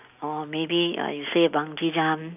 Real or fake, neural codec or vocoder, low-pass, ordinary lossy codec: real; none; 3.6 kHz; none